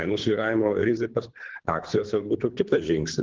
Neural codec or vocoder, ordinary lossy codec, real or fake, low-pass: codec, 24 kHz, 3 kbps, HILCodec; Opus, 16 kbps; fake; 7.2 kHz